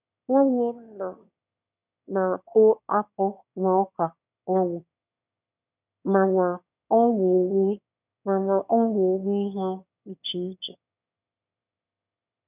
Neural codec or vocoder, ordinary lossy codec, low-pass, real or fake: autoencoder, 22.05 kHz, a latent of 192 numbers a frame, VITS, trained on one speaker; none; 3.6 kHz; fake